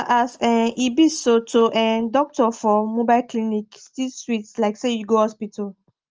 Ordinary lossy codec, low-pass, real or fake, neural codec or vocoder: Opus, 24 kbps; 7.2 kHz; real; none